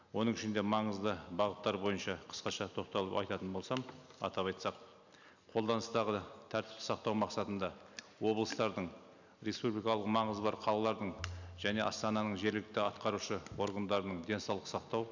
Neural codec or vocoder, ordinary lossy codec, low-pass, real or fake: none; none; 7.2 kHz; real